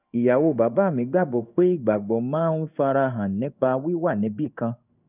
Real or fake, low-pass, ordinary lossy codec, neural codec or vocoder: fake; 3.6 kHz; none; codec, 16 kHz in and 24 kHz out, 1 kbps, XY-Tokenizer